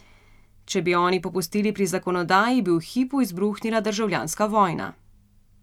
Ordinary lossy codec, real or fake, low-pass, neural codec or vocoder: none; real; 19.8 kHz; none